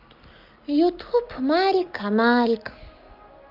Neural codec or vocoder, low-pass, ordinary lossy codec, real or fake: none; 5.4 kHz; Opus, 24 kbps; real